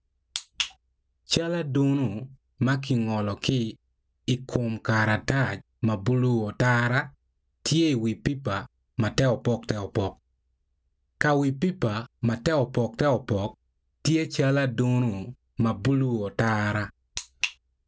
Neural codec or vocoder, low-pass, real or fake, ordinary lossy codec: none; none; real; none